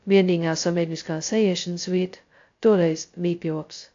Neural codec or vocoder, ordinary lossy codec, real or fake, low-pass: codec, 16 kHz, 0.2 kbps, FocalCodec; AAC, 48 kbps; fake; 7.2 kHz